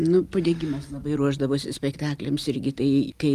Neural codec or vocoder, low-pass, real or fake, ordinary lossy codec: none; 14.4 kHz; real; Opus, 32 kbps